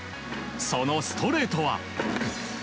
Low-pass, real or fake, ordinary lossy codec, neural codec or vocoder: none; real; none; none